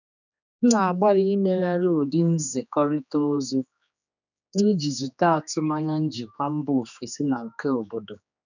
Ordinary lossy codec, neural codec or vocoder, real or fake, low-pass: none; codec, 16 kHz, 2 kbps, X-Codec, HuBERT features, trained on general audio; fake; 7.2 kHz